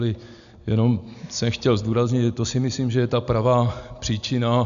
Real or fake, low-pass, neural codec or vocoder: real; 7.2 kHz; none